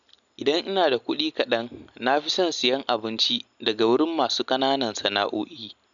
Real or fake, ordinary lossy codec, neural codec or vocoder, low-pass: real; none; none; 7.2 kHz